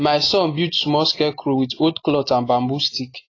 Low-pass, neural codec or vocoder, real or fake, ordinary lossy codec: 7.2 kHz; none; real; AAC, 32 kbps